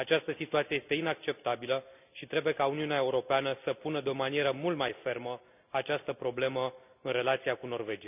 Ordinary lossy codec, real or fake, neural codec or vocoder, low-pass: none; real; none; 3.6 kHz